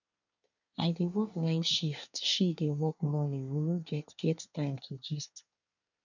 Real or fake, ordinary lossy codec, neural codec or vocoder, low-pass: fake; none; codec, 24 kHz, 1 kbps, SNAC; 7.2 kHz